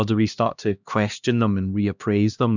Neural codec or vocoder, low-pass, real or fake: codec, 16 kHz, 1 kbps, X-Codec, HuBERT features, trained on LibriSpeech; 7.2 kHz; fake